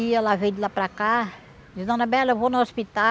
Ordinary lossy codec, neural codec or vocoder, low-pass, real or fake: none; none; none; real